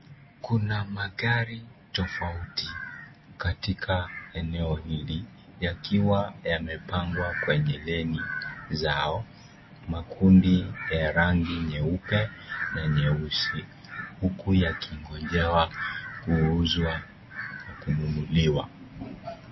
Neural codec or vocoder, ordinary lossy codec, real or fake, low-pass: none; MP3, 24 kbps; real; 7.2 kHz